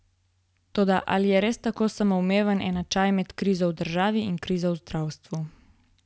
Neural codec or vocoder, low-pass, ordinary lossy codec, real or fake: none; none; none; real